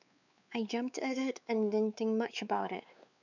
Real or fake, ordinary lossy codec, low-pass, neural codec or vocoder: fake; none; 7.2 kHz; codec, 16 kHz, 4 kbps, X-Codec, WavLM features, trained on Multilingual LibriSpeech